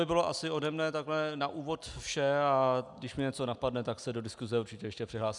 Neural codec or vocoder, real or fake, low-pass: none; real; 10.8 kHz